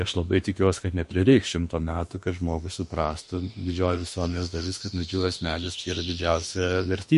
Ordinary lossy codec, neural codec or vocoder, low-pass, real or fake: MP3, 48 kbps; autoencoder, 48 kHz, 32 numbers a frame, DAC-VAE, trained on Japanese speech; 14.4 kHz; fake